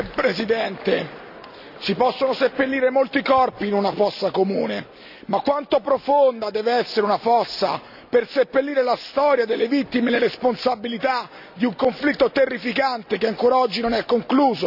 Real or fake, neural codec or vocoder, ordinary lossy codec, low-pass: real; none; none; 5.4 kHz